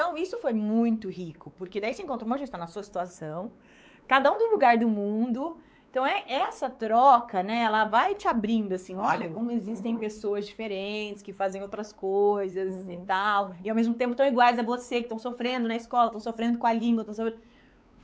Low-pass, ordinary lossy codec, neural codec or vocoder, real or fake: none; none; codec, 16 kHz, 4 kbps, X-Codec, WavLM features, trained on Multilingual LibriSpeech; fake